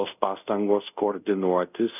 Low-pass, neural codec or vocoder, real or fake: 3.6 kHz; codec, 24 kHz, 0.5 kbps, DualCodec; fake